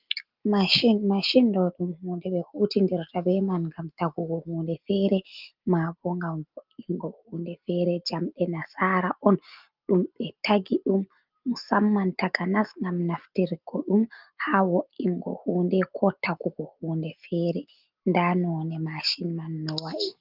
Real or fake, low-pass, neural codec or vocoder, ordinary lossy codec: real; 5.4 kHz; none; Opus, 32 kbps